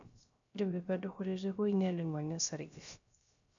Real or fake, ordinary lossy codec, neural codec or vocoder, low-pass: fake; none; codec, 16 kHz, 0.3 kbps, FocalCodec; 7.2 kHz